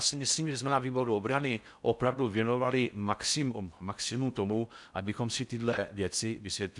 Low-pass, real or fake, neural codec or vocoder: 10.8 kHz; fake; codec, 16 kHz in and 24 kHz out, 0.6 kbps, FocalCodec, streaming, 4096 codes